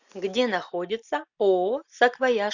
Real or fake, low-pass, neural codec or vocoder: real; 7.2 kHz; none